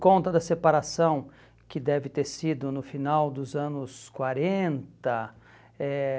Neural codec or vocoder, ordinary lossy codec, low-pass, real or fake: none; none; none; real